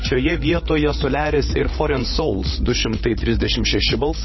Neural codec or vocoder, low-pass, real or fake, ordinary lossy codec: codec, 16 kHz, 8 kbps, FreqCodec, larger model; 7.2 kHz; fake; MP3, 24 kbps